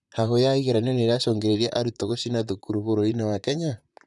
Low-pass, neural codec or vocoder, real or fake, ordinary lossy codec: 10.8 kHz; vocoder, 44.1 kHz, 128 mel bands, Pupu-Vocoder; fake; none